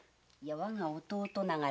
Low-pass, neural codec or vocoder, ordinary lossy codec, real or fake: none; none; none; real